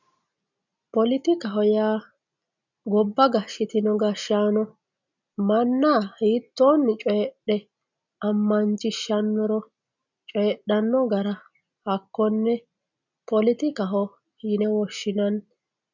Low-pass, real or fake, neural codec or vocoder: 7.2 kHz; real; none